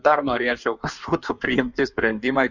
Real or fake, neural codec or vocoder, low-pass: fake; codec, 16 kHz in and 24 kHz out, 1.1 kbps, FireRedTTS-2 codec; 7.2 kHz